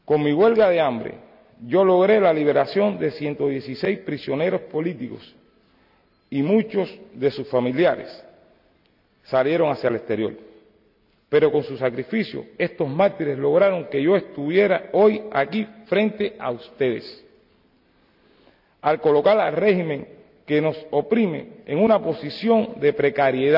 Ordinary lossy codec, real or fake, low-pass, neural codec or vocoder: none; real; 5.4 kHz; none